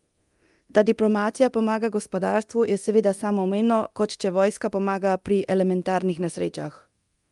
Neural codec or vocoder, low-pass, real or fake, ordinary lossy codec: codec, 24 kHz, 0.9 kbps, DualCodec; 10.8 kHz; fake; Opus, 32 kbps